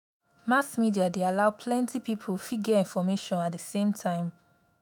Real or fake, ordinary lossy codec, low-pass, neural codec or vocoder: fake; none; none; autoencoder, 48 kHz, 128 numbers a frame, DAC-VAE, trained on Japanese speech